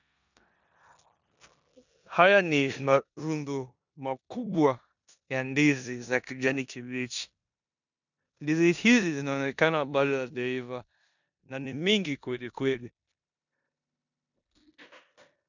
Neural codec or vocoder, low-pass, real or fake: codec, 16 kHz in and 24 kHz out, 0.9 kbps, LongCat-Audio-Codec, four codebook decoder; 7.2 kHz; fake